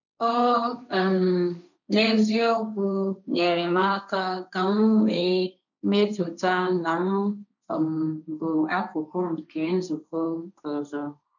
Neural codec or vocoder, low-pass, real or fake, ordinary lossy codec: codec, 16 kHz, 1.1 kbps, Voila-Tokenizer; 7.2 kHz; fake; none